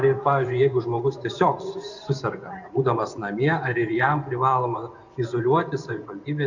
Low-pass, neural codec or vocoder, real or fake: 7.2 kHz; vocoder, 24 kHz, 100 mel bands, Vocos; fake